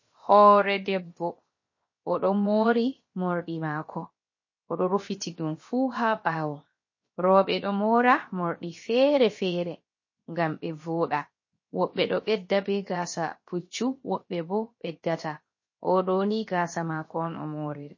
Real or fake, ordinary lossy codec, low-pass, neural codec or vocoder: fake; MP3, 32 kbps; 7.2 kHz; codec, 16 kHz, 0.7 kbps, FocalCodec